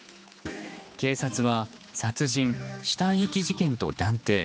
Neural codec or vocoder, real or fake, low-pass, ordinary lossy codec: codec, 16 kHz, 2 kbps, X-Codec, HuBERT features, trained on general audio; fake; none; none